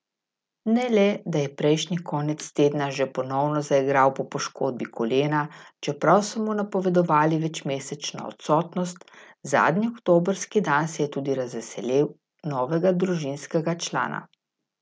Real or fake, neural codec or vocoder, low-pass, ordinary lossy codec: real; none; none; none